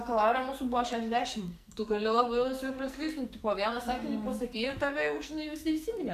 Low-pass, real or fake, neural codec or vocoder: 14.4 kHz; fake; codec, 44.1 kHz, 2.6 kbps, SNAC